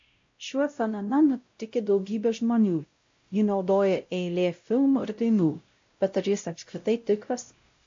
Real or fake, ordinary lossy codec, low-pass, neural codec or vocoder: fake; MP3, 48 kbps; 7.2 kHz; codec, 16 kHz, 0.5 kbps, X-Codec, WavLM features, trained on Multilingual LibriSpeech